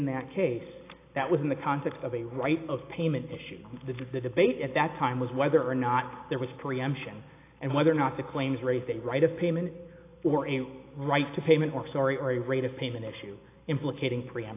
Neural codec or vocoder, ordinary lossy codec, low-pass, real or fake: none; AAC, 24 kbps; 3.6 kHz; real